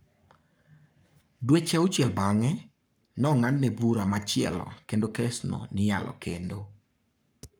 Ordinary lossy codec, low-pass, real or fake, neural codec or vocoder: none; none; fake; codec, 44.1 kHz, 7.8 kbps, Pupu-Codec